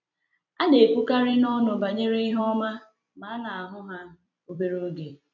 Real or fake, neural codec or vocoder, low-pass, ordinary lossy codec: fake; vocoder, 44.1 kHz, 128 mel bands every 256 samples, BigVGAN v2; 7.2 kHz; none